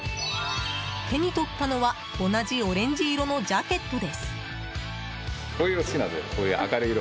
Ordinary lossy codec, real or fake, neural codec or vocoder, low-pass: none; real; none; none